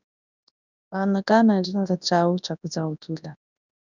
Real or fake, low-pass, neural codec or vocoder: fake; 7.2 kHz; codec, 24 kHz, 0.9 kbps, WavTokenizer, large speech release